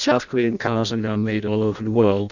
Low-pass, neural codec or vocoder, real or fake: 7.2 kHz; codec, 16 kHz in and 24 kHz out, 0.6 kbps, FireRedTTS-2 codec; fake